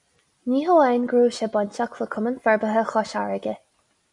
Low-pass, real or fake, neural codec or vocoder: 10.8 kHz; real; none